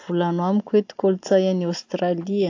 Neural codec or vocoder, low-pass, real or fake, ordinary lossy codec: none; 7.2 kHz; real; AAC, 48 kbps